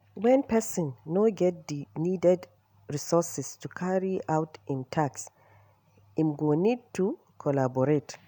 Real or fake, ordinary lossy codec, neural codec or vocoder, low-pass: real; none; none; none